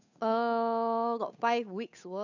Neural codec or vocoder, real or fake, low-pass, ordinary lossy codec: codec, 16 kHz, 8 kbps, FunCodec, trained on Chinese and English, 25 frames a second; fake; 7.2 kHz; none